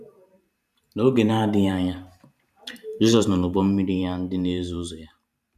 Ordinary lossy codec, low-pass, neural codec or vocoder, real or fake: none; 14.4 kHz; vocoder, 44.1 kHz, 128 mel bands every 512 samples, BigVGAN v2; fake